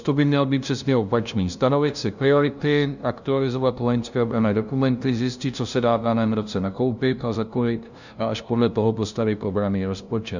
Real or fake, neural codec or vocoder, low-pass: fake; codec, 16 kHz, 0.5 kbps, FunCodec, trained on LibriTTS, 25 frames a second; 7.2 kHz